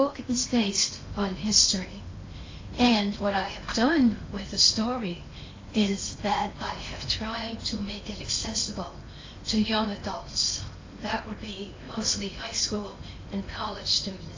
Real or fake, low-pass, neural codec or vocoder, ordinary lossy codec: fake; 7.2 kHz; codec, 16 kHz in and 24 kHz out, 0.8 kbps, FocalCodec, streaming, 65536 codes; AAC, 32 kbps